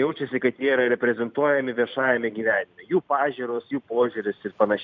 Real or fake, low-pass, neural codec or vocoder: fake; 7.2 kHz; vocoder, 44.1 kHz, 128 mel bands every 256 samples, BigVGAN v2